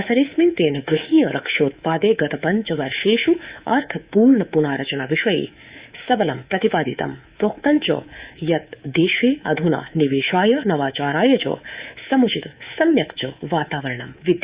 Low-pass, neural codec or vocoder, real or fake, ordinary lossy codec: 3.6 kHz; codec, 24 kHz, 3.1 kbps, DualCodec; fake; Opus, 64 kbps